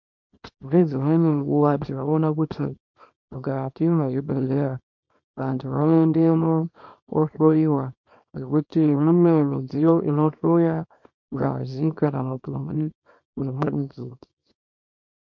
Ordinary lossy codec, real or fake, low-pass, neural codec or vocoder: MP3, 48 kbps; fake; 7.2 kHz; codec, 24 kHz, 0.9 kbps, WavTokenizer, small release